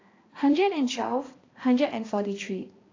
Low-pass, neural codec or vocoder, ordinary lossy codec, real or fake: 7.2 kHz; codec, 16 kHz, 1 kbps, X-Codec, HuBERT features, trained on balanced general audio; AAC, 32 kbps; fake